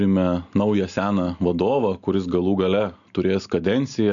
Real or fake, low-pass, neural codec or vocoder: real; 7.2 kHz; none